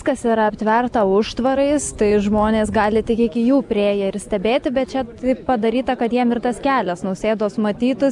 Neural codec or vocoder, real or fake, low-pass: none; real; 10.8 kHz